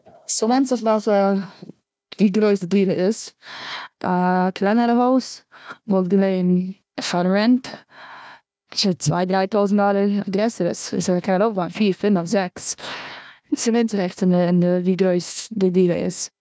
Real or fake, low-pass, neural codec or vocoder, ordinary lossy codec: fake; none; codec, 16 kHz, 1 kbps, FunCodec, trained on Chinese and English, 50 frames a second; none